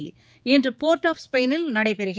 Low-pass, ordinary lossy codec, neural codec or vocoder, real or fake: none; none; codec, 16 kHz, 4 kbps, X-Codec, HuBERT features, trained on general audio; fake